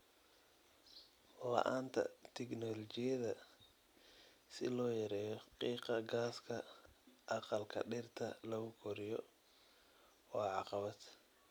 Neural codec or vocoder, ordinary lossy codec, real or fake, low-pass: none; none; real; none